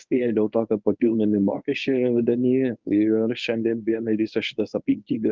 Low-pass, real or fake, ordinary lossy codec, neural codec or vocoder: 7.2 kHz; fake; Opus, 24 kbps; codec, 24 kHz, 0.9 kbps, WavTokenizer, medium speech release version 1